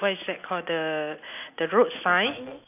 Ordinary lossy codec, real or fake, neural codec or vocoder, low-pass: none; real; none; 3.6 kHz